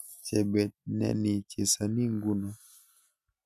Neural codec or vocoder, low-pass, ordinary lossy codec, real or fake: none; 14.4 kHz; none; real